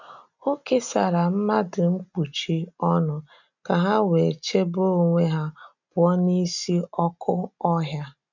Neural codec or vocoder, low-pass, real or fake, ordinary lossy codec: none; 7.2 kHz; real; none